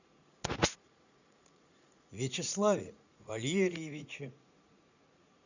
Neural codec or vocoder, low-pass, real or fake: vocoder, 44.1 kHz, 80 mel bands, Vocos; 7.2 kHz; fake